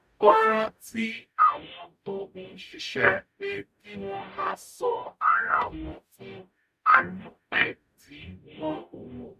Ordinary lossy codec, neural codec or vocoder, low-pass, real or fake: none; codec, 44.1 kHz, 0.9 kbps, DAC; 14.4 kHz; fake